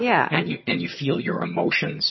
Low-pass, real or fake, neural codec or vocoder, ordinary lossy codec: 7.2 kHz; fake; vocoder, 22.05 kHz, 80 mel bands, HiFi-GAN; MP3, 24 kbps